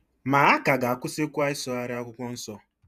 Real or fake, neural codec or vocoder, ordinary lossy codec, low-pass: fake; vocoder, 48 kHz, 128 mel bands, Vocos; none; 14.4 kHz